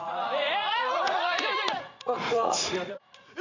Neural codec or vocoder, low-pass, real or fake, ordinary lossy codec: vocoder, 44.1 kHz, 80 mel bands, Vocos; 7.2 kHz; fake; none